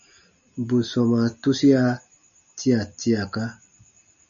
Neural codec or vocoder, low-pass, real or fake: none; 7.2 kHz; real